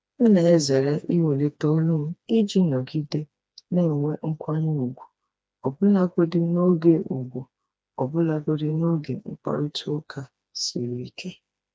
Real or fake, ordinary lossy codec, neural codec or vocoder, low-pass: fake; none; codec, 16 kHz, 2 kbps, FreqCodec, smaller model; none